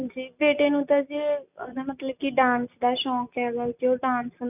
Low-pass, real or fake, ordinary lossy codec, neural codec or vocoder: 3.6 kHz; real; none; none